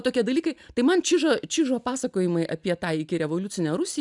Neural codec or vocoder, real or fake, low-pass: none; real; 10.8 kHz